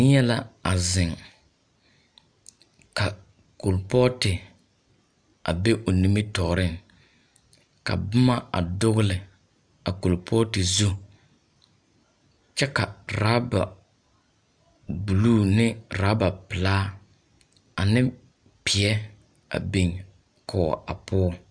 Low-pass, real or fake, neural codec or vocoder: 9.9 kHz; real; none